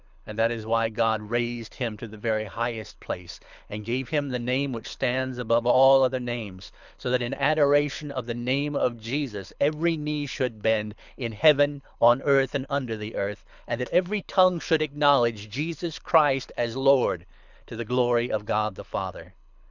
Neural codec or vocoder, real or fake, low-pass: codec, 24 kHz, 6 kbps, HILCodec; fake; 7.2 kHz